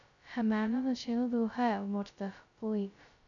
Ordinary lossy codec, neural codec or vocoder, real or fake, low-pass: none; codec, 16 kHz, 0.2 kbps, FocalCodec; fake; 7.2 kHz